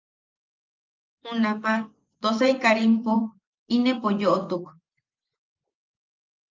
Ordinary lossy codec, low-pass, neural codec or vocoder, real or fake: Opus, 24 kbps; 7.2 kHz; vocoder, 24 kHz, 100 mel bands, Vocos; fake